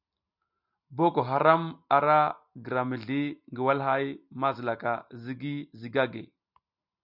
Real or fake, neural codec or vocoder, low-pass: real; none; 5.4 kHz